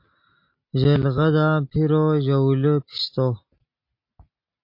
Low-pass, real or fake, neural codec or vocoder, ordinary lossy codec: 5.4 kHz; real; none; MP3, 32 kbps